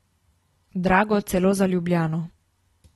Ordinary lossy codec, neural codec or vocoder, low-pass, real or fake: AAC, 32 kbps; none; 19.8 kHz; real